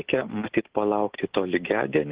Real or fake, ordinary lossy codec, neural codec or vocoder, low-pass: real; Opus, 16 kbps; none; 3.6 kHz